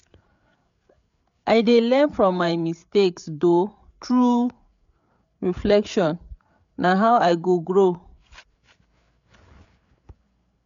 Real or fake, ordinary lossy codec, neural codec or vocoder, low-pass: fake; none; codec, 16 kHz, 8 kbps, FreqCodec, larger model; 7.2 kHz